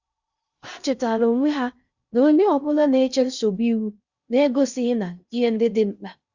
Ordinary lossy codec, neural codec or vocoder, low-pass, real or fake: Opus, 64 kbps; codec, 16 kHz in and 24 kHz out, 0.6 kbps, FocalCodec, streaming, 2048 codes; 7.2 kHz; fake